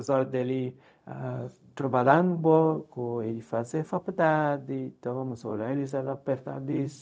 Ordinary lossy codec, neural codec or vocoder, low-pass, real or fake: none; codec, 16 kHz, 0.4 kbps, LongCat-Audio-Codec; none; fake